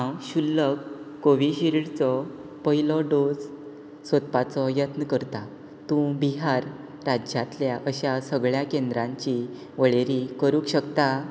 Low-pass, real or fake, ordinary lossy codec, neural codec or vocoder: none; real; none; none